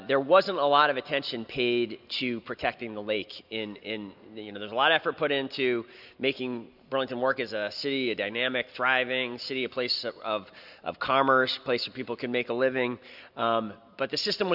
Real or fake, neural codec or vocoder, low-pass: real; none; 5.4 kHz